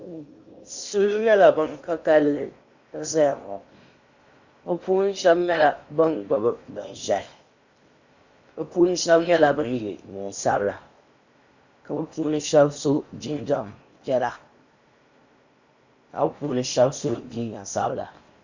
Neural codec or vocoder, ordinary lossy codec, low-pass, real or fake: codec, 16 kHz in and 24 kHz out, 0.8 kbps, FocalCodec, streaming, 65536 codes; Opus, 64 kbps; 7.2 kHz; fake